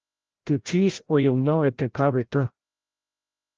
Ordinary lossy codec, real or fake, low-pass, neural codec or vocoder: Opus, 16 kbps; fake; 7.2 kHz; codec, 16 kHz, 0.5 kbps, FreqCodec, larger model